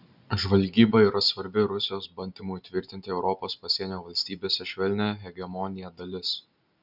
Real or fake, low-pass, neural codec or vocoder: real; 5.4 kHz; none